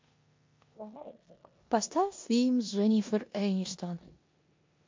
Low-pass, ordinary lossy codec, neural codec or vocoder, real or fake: 7.2 kHz; MP3, 48 kbps; codec, 16 kHz in and 24 kHz out, 0.9 kbps, LongCat-Audio-Codec, four codebook decoder; fake